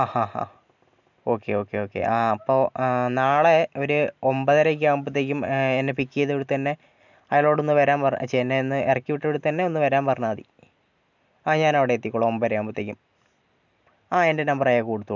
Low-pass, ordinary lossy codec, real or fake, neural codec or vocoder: 7.2 kHz; none; real; none